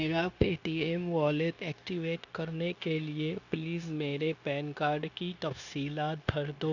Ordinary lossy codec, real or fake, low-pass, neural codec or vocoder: none; fake; 7.2 kHz; codec, 16 kHz, 0.9 kbps, LongCat-Audio-Codec